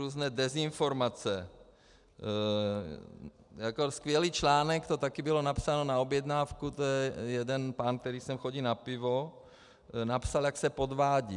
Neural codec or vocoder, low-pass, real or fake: none; 10.8 kHz; real